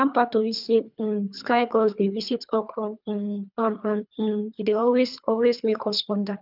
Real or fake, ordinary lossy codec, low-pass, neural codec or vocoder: fake; none; 5.4 kHz; codec, 24 kHz, 3 kbps, HILCodec